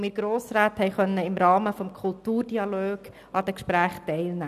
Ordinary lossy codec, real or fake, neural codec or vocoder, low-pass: none; real; none; 14.4 kHz